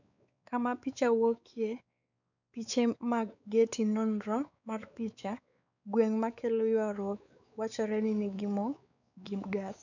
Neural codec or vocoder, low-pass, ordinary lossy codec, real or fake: codec, 16 kHz, 4 kbps, X-Codec, WavLM features, trained on Multilingual LibriSpeech; 7.2 kHz; none; fake